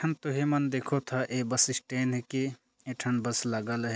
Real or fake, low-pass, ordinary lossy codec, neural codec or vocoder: real; none; none; none